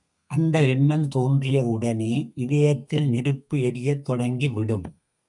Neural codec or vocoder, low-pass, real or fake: codec, 32 kHz, 1.9 kbps, SNAC; 10.8 kHz; fake